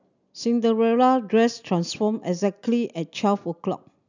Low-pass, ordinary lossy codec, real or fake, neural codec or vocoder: 7.2 kHz; none; real; none